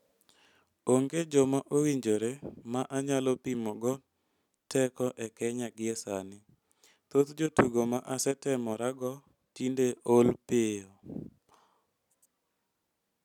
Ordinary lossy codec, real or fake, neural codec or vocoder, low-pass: none; real; none; 19.8 kHz